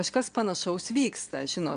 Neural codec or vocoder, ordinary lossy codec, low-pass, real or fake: vocoder, 22.05 kHz, 80 mel bands, WaveNeXt; AAC, 96 kbps; 9.9 kHz; fake